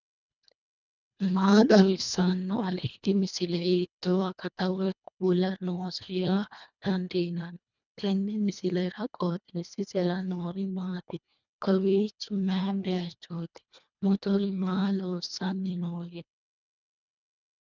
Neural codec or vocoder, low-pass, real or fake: codec, 24 kHz, 1.5 kbps, HILCodec; 7.2 kHz; fake